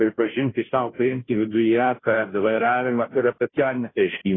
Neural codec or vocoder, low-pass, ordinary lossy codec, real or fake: codec, 16 kHz, 0.5 kbps, FunCodec, trained on Chinese and English, 25 frames a second; 7.2 kHz; AAC, 16 kbps; fake